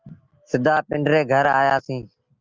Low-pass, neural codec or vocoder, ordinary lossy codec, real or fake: 7.2 kHz; none; Opus, 24 kbps; real